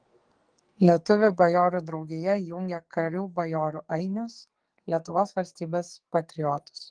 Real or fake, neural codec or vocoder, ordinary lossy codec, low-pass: fake; codec, 44.1 kHz, 2.6 kbps, SNAC; Opus, 24 kbps; 9.9 kHz